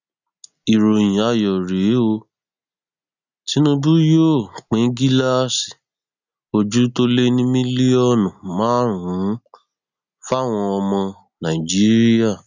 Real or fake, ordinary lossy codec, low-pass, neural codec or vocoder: real; none; 7.2 kHz; none